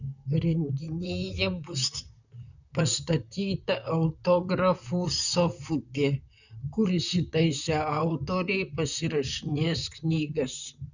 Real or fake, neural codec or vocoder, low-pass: fake; vocoder, 44.1 kHz, 128 mel bands, Pupu-Vocoder; 7.2 kHz